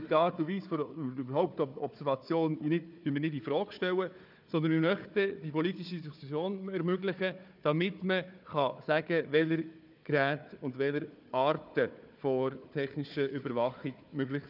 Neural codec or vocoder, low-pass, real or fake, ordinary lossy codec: codec, 16 kHz, 4 kbps, FunCodec, trained on Chinese and English, 50 frames a second; 5.4 kHz; fake; none